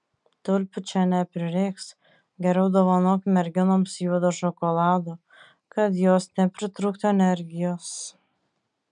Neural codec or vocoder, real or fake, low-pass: none; real; 9.9 kHz